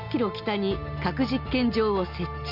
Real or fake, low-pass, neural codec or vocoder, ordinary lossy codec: real; 5.4 kHz; none; none